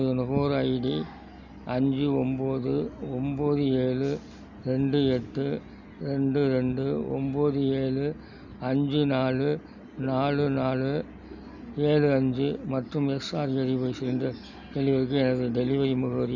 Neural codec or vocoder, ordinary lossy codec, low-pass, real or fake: none; none; 7.2 kHz; real